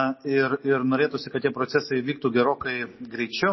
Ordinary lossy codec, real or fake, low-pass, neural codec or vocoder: MP3, 24 kbps; real; 7.2 kHz; none